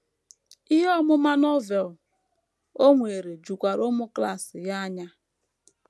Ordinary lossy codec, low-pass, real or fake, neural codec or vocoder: none; none; real; none